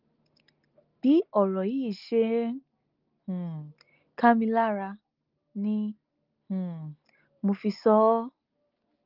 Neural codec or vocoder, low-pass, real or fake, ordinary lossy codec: none; 5.4 kHz; real; Opus, 32 kbps